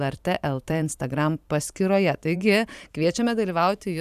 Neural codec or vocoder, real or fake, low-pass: vocoder, 44.1 kHz, 128 mel bands every 512 samples, BigVGAN v2; fake; 14.4 kHz